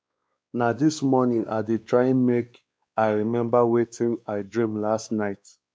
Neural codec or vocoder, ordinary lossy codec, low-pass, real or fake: codec, 16 kHz, 2 kbps, X-Codec, WavLM features, trained on Multilingual LibriSpeech; none; none; fake